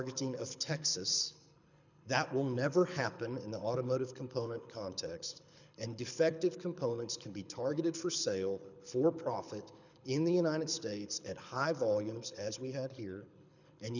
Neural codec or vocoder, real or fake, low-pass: codec, 24 kHz, 6 kbps, HILCodec; fake; 7.2 kHz